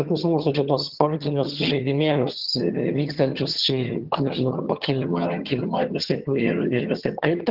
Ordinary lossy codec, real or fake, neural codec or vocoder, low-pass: Opus, 16 kbps; fake; vocoder, 22.05 kHz, 80 mel bands, HiFi-GAN; 5.4 kHz